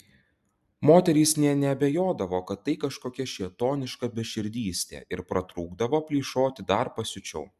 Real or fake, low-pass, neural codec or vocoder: real; 14.4 kHz; none